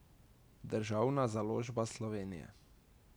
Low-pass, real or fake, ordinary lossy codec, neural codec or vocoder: none; real; none; none